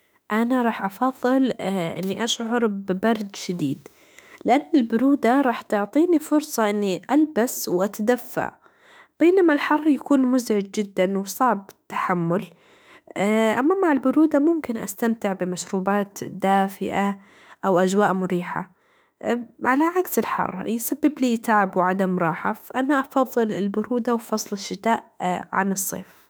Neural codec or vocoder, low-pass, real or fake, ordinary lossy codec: autoencoder, 48 kHz, 32 numbers a frame, DAC-VAE, trained on Japanese speech; none; fake; none